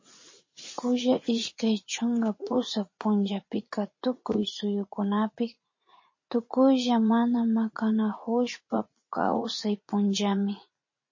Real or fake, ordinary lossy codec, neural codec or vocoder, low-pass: fake; MP3, 32 kbps; vocoder, 44.1 kHz, 80 mel bands, Vocos; 7.2 kHz